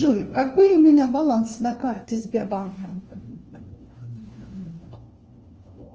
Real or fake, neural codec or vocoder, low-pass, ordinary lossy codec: fake; codec, 16 kHz, 1 kbps, FunCodec, trained on LibriTTS, 50 frames a second; 7.2 kHz; Opus, 24 kbps